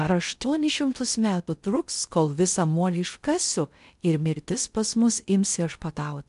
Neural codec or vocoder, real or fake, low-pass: codec, 16 kHz in and 24 kHz out, 0.6 kbps, FocalCodec, streaming, 2048 codes; fake; 10.8 kHz